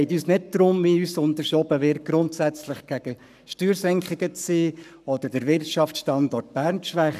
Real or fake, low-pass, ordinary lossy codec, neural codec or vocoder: fake; 14.4 kHz; none; codec, 44.1 kHz, 7.8 kbps, Pupu-Codec